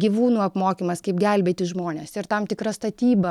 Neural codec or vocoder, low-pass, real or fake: none; 19.8 kHz; real